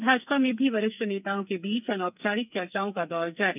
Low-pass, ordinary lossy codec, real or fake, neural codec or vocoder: 3.6 kHz; none; fake; codec, 44.1 kHz, 2.6 kbps, SNAC